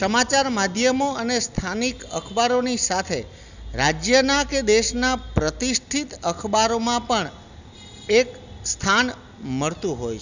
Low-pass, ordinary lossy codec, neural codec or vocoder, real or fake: 7.2 kHz; none; none; real